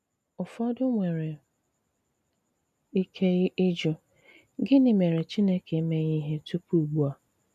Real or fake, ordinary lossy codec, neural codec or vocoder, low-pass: real; none; none; 14.4 kHz